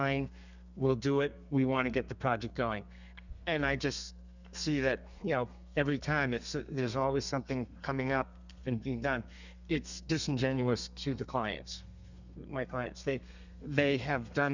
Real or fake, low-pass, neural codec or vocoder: fake; 7.2 kHz; codec, 32 kHz, 1.9 kbps, SNAC